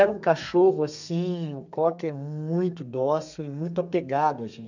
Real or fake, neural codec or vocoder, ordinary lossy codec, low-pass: fake; codec, 32 kHz, 1.9 kbps, SNAC; none; 7.2 kHz